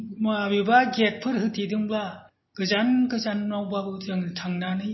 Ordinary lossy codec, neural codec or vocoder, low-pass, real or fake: MP3, 24 kbps; none; 7.2 kHz; real